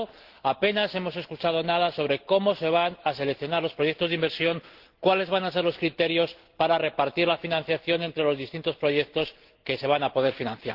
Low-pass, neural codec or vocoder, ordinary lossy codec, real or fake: 5.4 kHz; none; Opus, 16 kbps; real